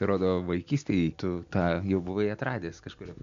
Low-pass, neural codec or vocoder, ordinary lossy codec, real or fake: 7.2 kHz; none; MP3, 64 kbps; real